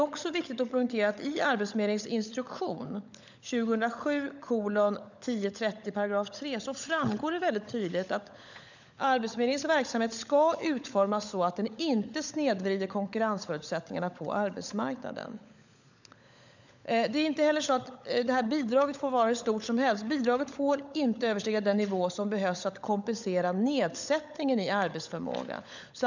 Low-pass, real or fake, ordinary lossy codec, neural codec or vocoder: 7.2 kHz; fake; none; codec, 16 kHz, 16 kbps, FunCodec, trained on LibriTTS, 50 frames a second